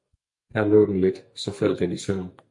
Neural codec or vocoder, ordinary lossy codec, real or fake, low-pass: codec, 44.1 kHz, 2.6 kbps, SNAC; MP3, 48 kbps; fake; 10.8 kHz